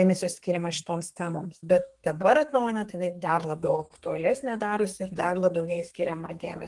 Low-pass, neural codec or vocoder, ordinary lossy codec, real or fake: 10.8 kHz; codec, 32 kHz, 1.9 kbps, SNAC; Opus, 24 kbps; fake